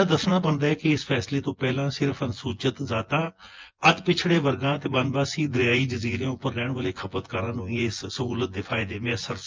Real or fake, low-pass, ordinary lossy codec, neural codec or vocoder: fake; 7.2 kHz; Opus, 24 kbps; vocoder, 24 kHz, 100 mel bands, Vocos